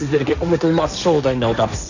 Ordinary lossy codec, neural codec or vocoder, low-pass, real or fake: none; codec, 16 kHz, 1.1 kbps, Voila-Tokenizer; 7.2 kHz; fake